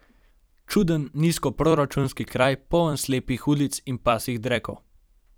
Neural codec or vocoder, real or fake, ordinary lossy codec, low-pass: vocoder, 44.1 kHz, 128 mel bands every 256 samples, BigVGAN v2; fake; none; none